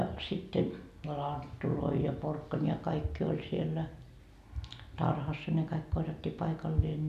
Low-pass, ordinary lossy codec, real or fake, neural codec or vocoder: 14.4 kHz; none; real; none